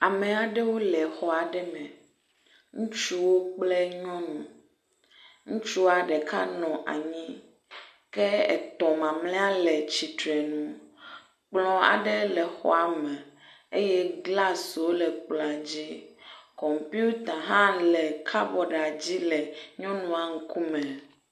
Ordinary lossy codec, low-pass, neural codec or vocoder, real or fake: MP3, 64 kbps; 14.4 kHz; none; real